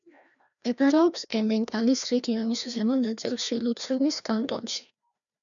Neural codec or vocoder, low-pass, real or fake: codec, 16 kHz, 1 kbps, FreqCodec, larger model; 7.2 kHz; fake